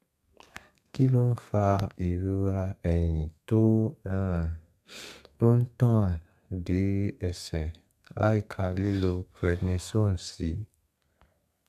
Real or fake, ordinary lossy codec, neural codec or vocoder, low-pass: fake; none; codec, 32 kHz, 1.9 kbps, SNAC; 14.4 kHz